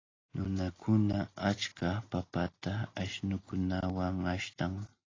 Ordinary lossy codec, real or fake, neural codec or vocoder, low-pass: AAC, 32 kbps; real; none; 7.2 kHz